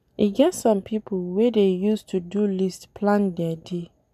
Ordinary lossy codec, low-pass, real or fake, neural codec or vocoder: none; 14.4 kHz; real; none